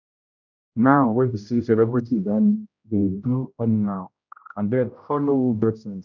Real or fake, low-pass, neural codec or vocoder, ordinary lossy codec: fake; 7.2 kHz; codec, 16 kHz, 0.5 kbps, X-Codec, HuBERT features, trained on general audio; none